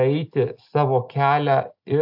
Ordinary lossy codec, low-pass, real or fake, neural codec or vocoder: AAC, 48 kbps; 5.4 kHz; real; none